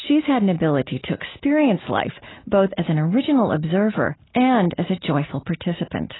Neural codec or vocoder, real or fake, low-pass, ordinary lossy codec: none; real; 7.2 kHz; AAC, 16 kbps